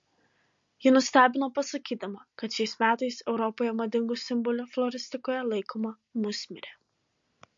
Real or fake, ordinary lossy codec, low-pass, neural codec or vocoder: real; MP3, 48 kbps; 7.2 kHz; none